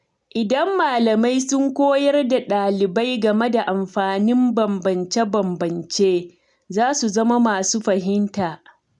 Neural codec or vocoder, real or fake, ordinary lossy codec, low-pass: none; real; none; 10.8 kHz